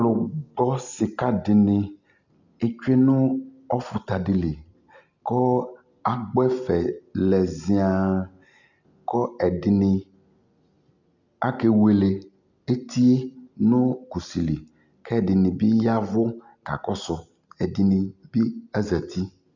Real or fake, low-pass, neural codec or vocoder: real; 7.2 kHz; none